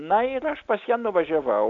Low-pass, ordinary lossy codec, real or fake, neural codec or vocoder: 7.2 kHz; AAC, 64 kbps; real; none